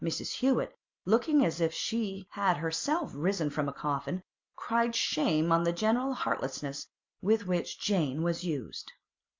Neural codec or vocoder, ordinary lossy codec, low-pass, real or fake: none; MP3, 64 kbps; 7.2 kHz; real